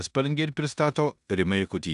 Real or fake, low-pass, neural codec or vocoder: fake; 10.8 kHz; codec, 16 kHz in and 24 kHz out, 0.9 kbps, LongCat-Audio-Codec, four codebook decoder